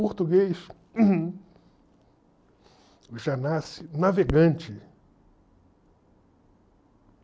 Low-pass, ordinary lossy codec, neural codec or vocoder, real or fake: none; none; none; real